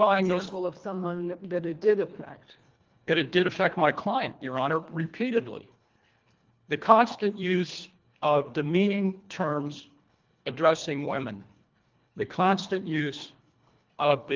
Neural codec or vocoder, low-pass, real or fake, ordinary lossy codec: codec, 24 kHz, 1.5 kbps, HILCodec; 7.2 kHz; fake; Opus, 32 kbps